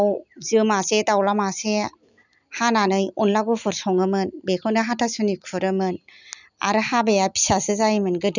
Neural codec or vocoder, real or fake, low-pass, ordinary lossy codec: none; real; 7.2 kHz; none